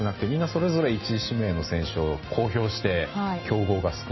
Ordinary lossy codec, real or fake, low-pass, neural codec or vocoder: MP3, 24 kbps; real; 7.2 kHz; none